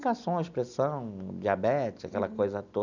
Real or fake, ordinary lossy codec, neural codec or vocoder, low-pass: real; none; none; 7.2 kHz